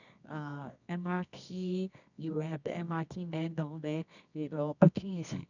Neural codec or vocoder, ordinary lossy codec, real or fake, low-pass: codec, 24 kHz, 0.9 kbps, WavTokenizer, medium music audio release; none; fake; 7.2 kHz